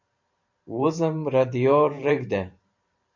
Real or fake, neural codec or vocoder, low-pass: fake; vocoder, 44.1 kHz, 128 mel bands every 512 samples, BigVGAN v2; 7.2 kHz